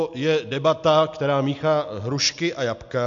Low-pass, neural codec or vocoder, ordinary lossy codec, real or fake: 7.2 kHz; none; AAC, 64 kbps; real